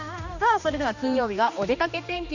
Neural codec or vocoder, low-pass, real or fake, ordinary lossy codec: codec, 16 kHz, 2 kbps, X-Codec, HuBERT features, trained on general audio; 7.2 kHz; fake; none